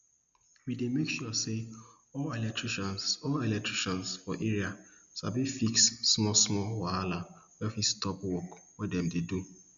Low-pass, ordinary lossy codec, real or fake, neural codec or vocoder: 7.2 kHz; none; real; none